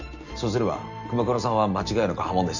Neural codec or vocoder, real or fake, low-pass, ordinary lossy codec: none; real; 7.2 kHz; none